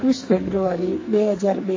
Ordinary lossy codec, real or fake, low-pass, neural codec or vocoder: MP3, 32 kbps; fake; 7.2 kHz; codec, 44.1 kHz, 2.6 kbps, SNAC